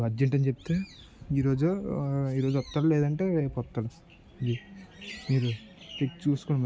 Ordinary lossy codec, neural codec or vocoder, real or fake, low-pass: none; none; real; none